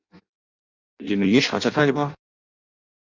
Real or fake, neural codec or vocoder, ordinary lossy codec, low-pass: fake; codec, 16 kHz in and 24 kHz out, 0.6 kbps, FireRedTTS-2 codec; Opus, 64 kbps; 7.2 kHz